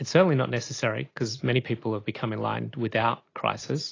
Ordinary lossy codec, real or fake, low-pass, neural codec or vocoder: AAC, 32 kbps; real; 7.2 kHz; none